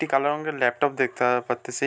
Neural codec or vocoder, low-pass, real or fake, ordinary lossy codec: none; none; real; none